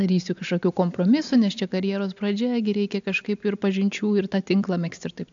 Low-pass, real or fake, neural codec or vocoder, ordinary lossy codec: 7.2 kHz; real; none; AAC, 64 kbps